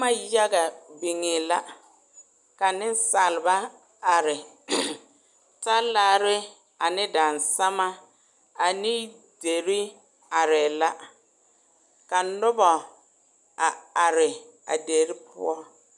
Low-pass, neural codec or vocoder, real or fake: 10.8 kHz; none; real